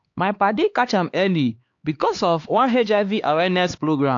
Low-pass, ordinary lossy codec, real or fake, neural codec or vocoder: 7.2 kHz; AAC, 48 kbps; fake; codec, 16 kHz, 2 kbps, X-Codec, HuBERT features, trained on LibriSpeech